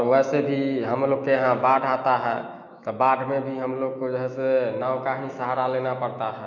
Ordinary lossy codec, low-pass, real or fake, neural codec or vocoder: none; 7.2 kHz; fake; autoencoder, 48 kHz, 128 numbers a frame, DAC-VAE, trained on Japanese speech